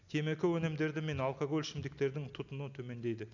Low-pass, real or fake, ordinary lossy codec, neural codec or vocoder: 7.2 kHz; real; none; none